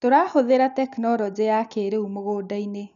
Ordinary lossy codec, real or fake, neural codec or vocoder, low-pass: none; real; none; 7.2 kHz